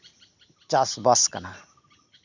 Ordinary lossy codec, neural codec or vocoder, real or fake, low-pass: none; none; real; 7.2 kHz